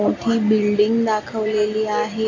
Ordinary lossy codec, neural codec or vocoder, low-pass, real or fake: AAC, 32 kbps; none; 7.2 kHz; real